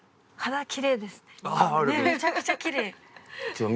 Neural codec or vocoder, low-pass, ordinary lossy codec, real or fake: none; none; none; real